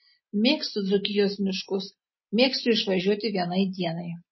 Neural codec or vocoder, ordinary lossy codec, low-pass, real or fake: none; MP3, 24 kbps; 7.2 kHz; real